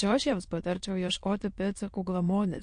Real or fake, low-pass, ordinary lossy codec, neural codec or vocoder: fake; 9.9 kHz; MP3, 48 kbps; autoencoder, 22.05 kHz, a latent of 192 numbers a frame, VITS, trained on many speakers